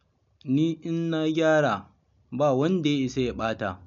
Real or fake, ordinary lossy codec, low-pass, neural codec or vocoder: real; none; 7.2 kHz; none